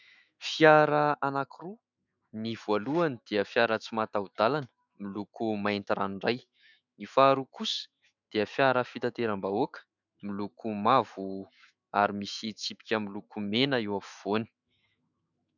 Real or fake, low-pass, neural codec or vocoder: fake; 7.2 kHz; autoencoder, 48 kHz, 128 numbers a frame, DAC-VAE, trained on Japanese speech